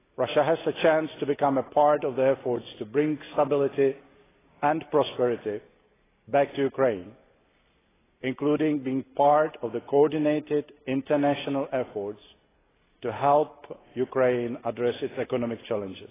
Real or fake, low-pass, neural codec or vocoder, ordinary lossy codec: real; 3.6 kHz; none; AAC, 16 kbps